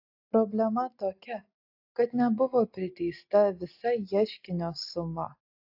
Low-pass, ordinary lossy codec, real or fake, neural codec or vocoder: 5.4 kHz; AAC, 48 kbps; real; none